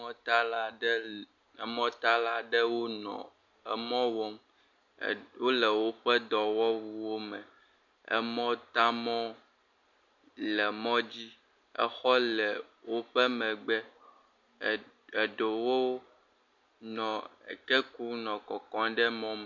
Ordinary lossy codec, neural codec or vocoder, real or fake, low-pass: MP3, 48 kbps; none; real; 7.2 kHz